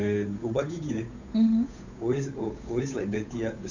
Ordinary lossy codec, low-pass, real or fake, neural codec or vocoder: none; 7.2 kHz; fake; codec, 16 kHz, 8 kbps, FunCodec, trained on Chinese and English, 25 frames a second